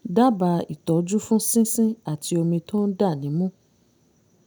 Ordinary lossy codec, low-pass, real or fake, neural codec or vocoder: none; none; real; none